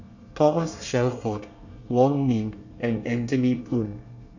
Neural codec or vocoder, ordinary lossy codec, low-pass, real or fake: codec, 24 kHz, 1 kbps, SNAC; none; 7.2 kHz; fake